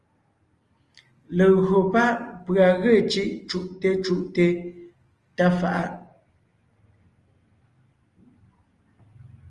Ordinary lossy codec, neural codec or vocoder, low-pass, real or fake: Opus, 24 kbps; none; 10.8 kHz; real